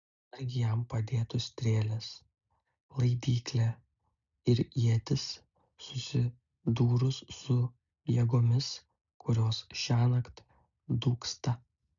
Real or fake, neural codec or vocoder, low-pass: real; none; 7.2 kHz